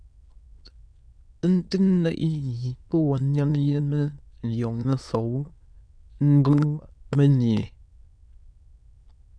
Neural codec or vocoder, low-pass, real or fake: autoencoder, 22.05 kHz, a latent of 192 numbers a frame, VITS, trained on many speakers; 9.9 kHz; fake